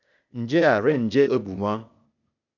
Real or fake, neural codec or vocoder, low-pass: fake; codec, 16 kHz, 0.8 kbps, ZipCodec; 7.2 kHz